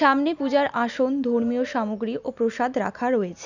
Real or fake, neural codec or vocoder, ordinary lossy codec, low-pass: real; none; none; 7.2 kHz